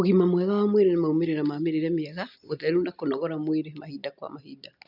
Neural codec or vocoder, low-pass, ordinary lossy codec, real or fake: none; 5.4 kHz; none; real